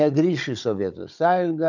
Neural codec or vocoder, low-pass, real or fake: codec, 16 kHz, 16 kbps, FunCodec, trained on Chinese and English, 50 frames a second; 7.2 kHz; fake